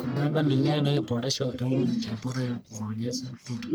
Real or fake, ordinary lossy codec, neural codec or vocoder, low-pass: fake; none; codec, 44.1 kHz, 1.7 kbps, Pupu-Codec; none